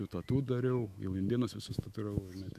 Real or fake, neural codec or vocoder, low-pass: fake; autoencoder, 48 kHz, 128 numbers a frame, DAC-VAE, trained on Japanese speech; 14.4 kHz